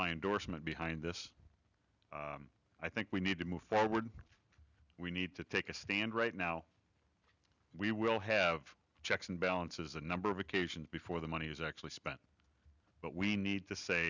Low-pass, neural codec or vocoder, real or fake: 7.2 kHz; none; real